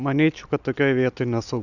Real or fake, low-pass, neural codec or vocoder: real; 7.2 kHz; none